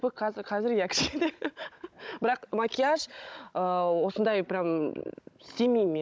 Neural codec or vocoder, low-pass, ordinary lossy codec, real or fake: codec, 16 kHz, 16 kbps, FunCodec, trained on Chinese and English, 50 frames a second; none; none; fake